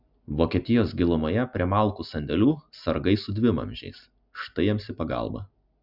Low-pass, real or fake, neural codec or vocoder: 5.4 kHz; real; none